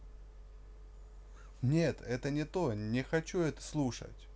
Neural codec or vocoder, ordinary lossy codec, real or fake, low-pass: none; none; real; none